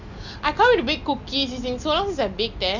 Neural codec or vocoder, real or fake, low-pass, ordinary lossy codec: none; real; 7.2 kHz; none